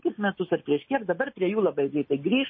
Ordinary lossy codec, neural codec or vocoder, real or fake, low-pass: MP3, 24 kbps; none; real; 7.2 kHz